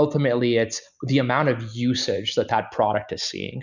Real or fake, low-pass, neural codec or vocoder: real; 7.2 kHz; none